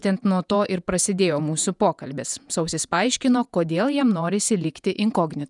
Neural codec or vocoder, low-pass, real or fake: vocoder, 48 kHz, 128 mel bands, Vocos; 10.8 kHz; fake